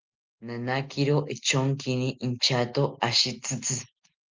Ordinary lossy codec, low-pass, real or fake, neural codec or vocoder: Opus, 24 kbps; 7.2 kHz; real; none